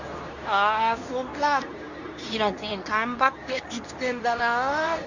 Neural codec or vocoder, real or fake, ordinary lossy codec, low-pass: codec, 24 kHz, 0.9 kbps, WavTokenizer, medium speech release version 2; fake; none; 7.2 kHz